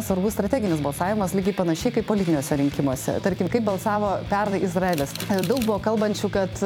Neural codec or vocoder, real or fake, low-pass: vocoder, 44.1 kHz, 128 mel bands every 256 samples, BigVGAN v2; fake; 19.8 kHz